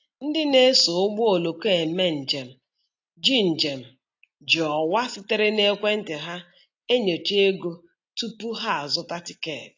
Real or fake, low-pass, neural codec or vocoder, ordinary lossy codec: real; 7.2 kHz; none; AAC, 48 kbps